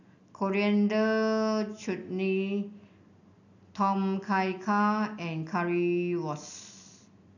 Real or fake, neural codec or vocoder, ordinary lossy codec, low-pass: real; none; none; 7.2 kHz